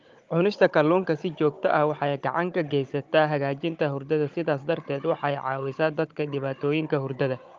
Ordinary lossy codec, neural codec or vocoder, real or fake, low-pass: Opus, 24 kbps; codec, 16 kHz, 16 kbps, FunCodec, trained on Chinese and English, 50 frames a second; fake; 7.2 kHz